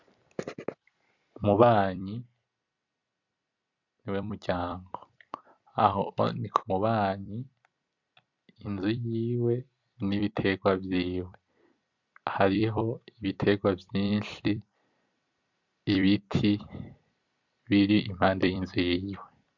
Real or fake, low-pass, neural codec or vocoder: fake; 7.2 kHz; vocoder, 44.1 kHz, 128 mel bands every 256 samples, BigVGAN v2